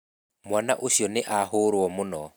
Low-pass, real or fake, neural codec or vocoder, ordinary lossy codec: none; real; none; none